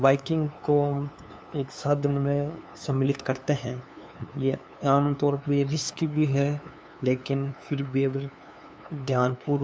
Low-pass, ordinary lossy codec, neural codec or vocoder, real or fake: none; none; codec, 16 kHz, 2 kbps, FunCodec, trained on LibriTTS, 25 frames a second; fake